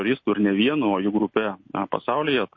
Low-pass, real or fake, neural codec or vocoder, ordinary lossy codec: 7.2 kHz; real; none; MP3, 32 kbps